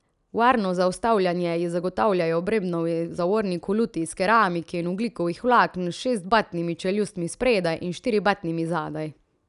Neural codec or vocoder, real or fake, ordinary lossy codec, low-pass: none; real; none; 10.8 kHz